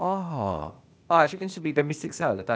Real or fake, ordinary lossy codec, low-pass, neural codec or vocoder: fake; none; none; codec, 16 kHz, 0.8 kbps, ZipCodec